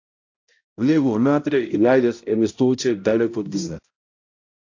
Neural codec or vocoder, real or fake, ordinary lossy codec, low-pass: codec, 16 kHz, 0.5 kbps, X-Codec, HuBERT features, trained on balanced general audio; fake; AAC, 48 kbps; 7.2 kHz